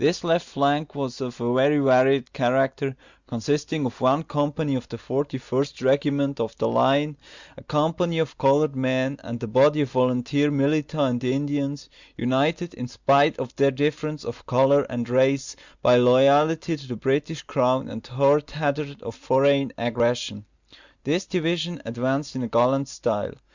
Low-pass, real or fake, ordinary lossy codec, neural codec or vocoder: 7.2 kHz; real; Opus, 64 kbps; none